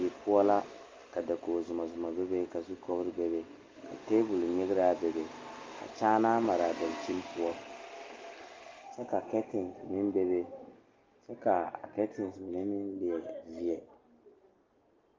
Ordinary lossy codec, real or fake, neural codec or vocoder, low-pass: Opus, 24 kbps; real; none; 7.2 kHz